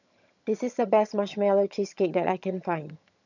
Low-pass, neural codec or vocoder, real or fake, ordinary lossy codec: 7.2 kHz; vocoder, 22.05 kHz, 80 mel bands, HiFi-GAN; fake; none